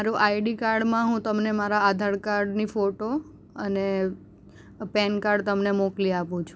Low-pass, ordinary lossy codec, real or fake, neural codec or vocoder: none; none; real; none